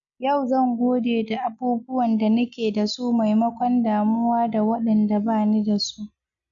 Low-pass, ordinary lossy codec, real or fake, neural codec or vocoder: 7.2 kHz; none; real; none